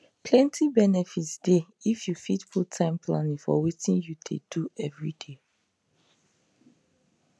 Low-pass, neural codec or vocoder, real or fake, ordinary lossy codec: none; none; real; none